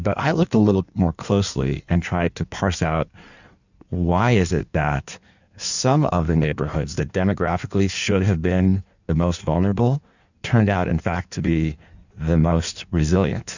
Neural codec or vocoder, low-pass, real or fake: codec, 16 kHz in and 24 kHz out, 1.1 kbps, FireRedTTS-2 codec; 7.2 kHz; fake